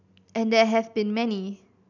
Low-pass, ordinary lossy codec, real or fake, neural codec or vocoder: 7.2 kHz; none; real; none